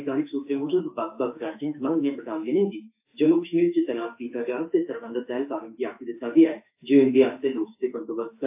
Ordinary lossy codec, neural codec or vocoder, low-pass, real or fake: AAC, 32 kbps; autoencoder, 48 kHz, 32 numbers a frame, DAC-VAE, trained on Japanese speech; 3.6 kHz; fake